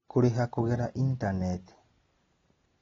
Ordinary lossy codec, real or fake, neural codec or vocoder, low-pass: AAC, 24 kbps; real; none; 7.2 kHz